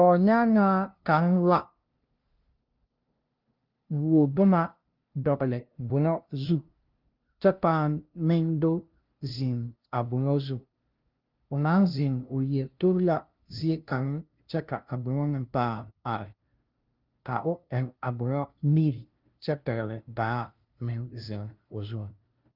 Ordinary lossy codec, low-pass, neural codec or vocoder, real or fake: Opus, 32 kbps; 5.4 kHz; codec, 16 kHz, 0.5 kbps, FunCodec, trained on LibriTTS, 25 frames a second; fake